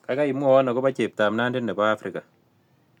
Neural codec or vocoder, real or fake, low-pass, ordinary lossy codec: none; real; 19.8 kHz; MP3, 96 kbps